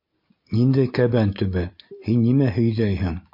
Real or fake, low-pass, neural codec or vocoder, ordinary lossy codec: real; 5.4 kHz; none; MP3, 32 kbps